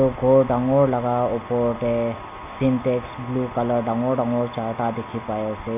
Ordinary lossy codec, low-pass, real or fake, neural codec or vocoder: none; 3.6 kHz; real; none